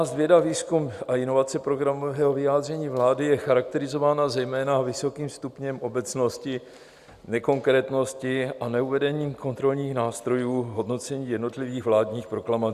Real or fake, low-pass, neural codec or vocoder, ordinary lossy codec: real; 14.4 kHz; none; Opus, 64 kbps